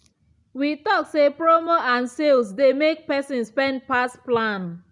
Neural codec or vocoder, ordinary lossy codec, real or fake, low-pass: none; none; real; 10.8 kHz